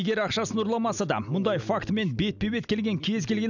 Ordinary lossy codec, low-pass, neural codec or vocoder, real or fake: none; 7.2 kHz; none; real